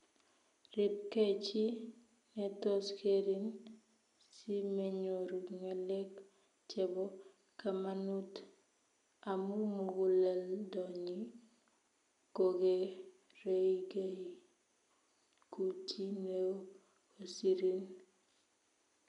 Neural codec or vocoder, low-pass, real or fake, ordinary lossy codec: none; 10.8 kHz; real; MP3, 96 kbps